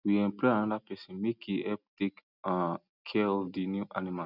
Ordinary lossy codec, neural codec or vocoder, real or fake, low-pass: none; none; real; 5.4 kHz